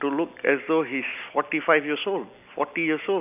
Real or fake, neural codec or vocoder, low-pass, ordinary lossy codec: real; none; 3.6 kHz; none